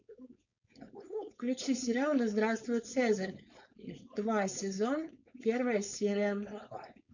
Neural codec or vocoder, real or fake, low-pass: codec, 16 kHz, 4.8 kbps, FACodec; fake; 7.2 kHz